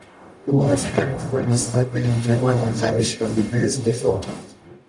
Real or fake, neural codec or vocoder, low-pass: fake; codec, 44.1 kHz, 0.9 kbps, DAC; 10.8 kHz